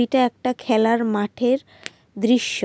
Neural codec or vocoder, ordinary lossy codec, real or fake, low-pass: none; none; real; none